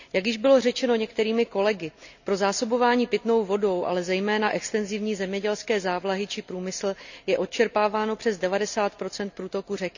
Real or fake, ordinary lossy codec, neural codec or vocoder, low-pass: real; none; none; 7.2 kHz